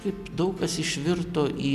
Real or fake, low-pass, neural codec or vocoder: real; 14.4 kHz; none